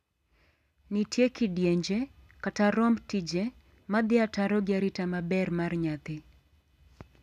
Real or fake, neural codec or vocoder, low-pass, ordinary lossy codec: real; none; 14.4 kHz; none